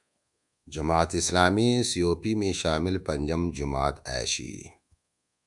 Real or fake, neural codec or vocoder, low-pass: fake; codec, 24 kHz, 1.2 kbps, DualCodec; 10.8 kHz